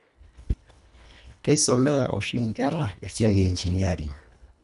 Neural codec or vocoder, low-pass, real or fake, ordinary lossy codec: codec, 24 kHz, 1.5 kbps, HILCodec; 10.8 kHz; fake; none